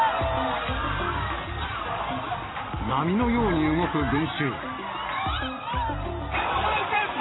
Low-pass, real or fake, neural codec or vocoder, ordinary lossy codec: 7.2 kHz; real; none; AAC, 16 kbps